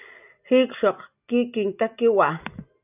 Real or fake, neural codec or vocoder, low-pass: real; none; 3.6 kHz